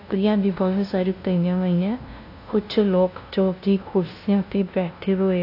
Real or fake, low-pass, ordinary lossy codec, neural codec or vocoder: fake; 5.4 kHz; AAC, 32 kbps; codec, 16 kHz, 0.5 kbps, FunCodec, trained on LibriTTS, 25 frames a second